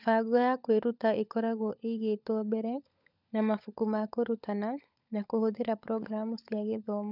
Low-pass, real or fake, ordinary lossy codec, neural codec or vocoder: 5.4 kHz; fake; none; codec, 16 kHz, 16 kbps, FunCodec, trained on LibriTTS, 50 frames a second